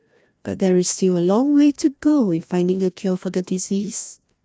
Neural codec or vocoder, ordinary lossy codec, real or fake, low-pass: codec, 16 kHz, 1 kbps, FreqCodec, larger model; none; fake; none